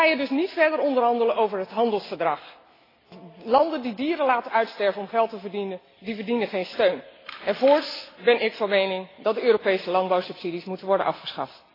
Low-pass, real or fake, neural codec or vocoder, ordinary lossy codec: 5.4 kHz; real; none; AAC, 24 kbps